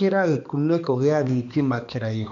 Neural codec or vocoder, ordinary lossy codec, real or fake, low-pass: codec, 16 kHz, 2 kbps, X-Codec, HuBERT features, trained on balanced general audio; none; fake; 7.2 kHz